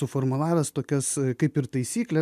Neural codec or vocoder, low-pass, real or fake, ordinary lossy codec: none; 14.4 kHz; real; MP3, 96 kbps